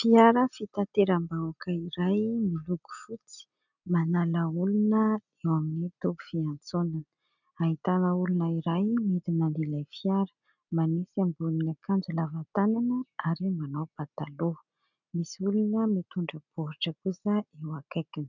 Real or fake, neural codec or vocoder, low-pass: real; none; 7.2 kHz